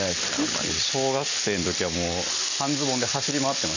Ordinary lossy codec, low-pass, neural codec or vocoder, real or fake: none; 7.2 kHz; none; real